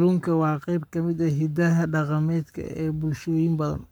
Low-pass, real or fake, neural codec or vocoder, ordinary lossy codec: none; fake; codec, 44.1 kHz, 7.8 kbps, Pupu-Codec; none